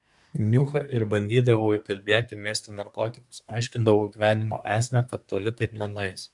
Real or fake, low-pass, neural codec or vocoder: fake; 10.8 kHz; codec, 24 kHz, 1 kbps, SNAC